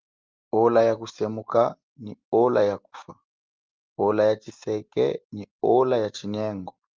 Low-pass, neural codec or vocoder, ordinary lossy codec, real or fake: 7.2 kHz; none; Opus, 32 kbps; real